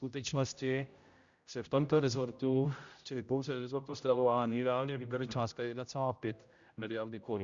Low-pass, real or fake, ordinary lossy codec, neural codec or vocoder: 7.2 kHz; fake; Opus, 64 kbps; codec, 16 kHz, 0.5 kbps, X-Codec, HuBERT features, trained on general audio